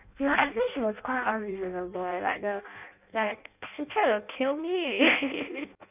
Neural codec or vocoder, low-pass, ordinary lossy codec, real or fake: codec, 16 kHz in and 24 kHz out, 0.6 kbps, FireRedTTS-2 codec; 3.6 kHz; none; fake